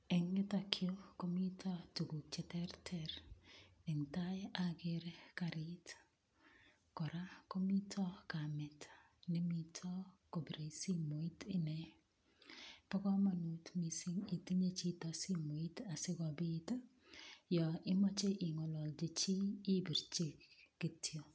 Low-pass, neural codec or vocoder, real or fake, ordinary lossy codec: none; none; real; none